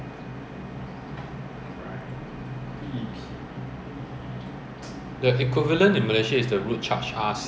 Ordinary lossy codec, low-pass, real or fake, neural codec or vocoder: none; none; real; none